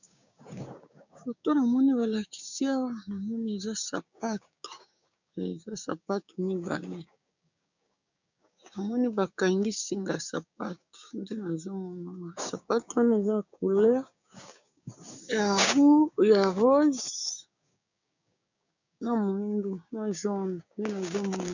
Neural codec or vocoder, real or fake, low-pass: codec, 16 kHz, 6 kbps, DAC; fake; 7.2 kHz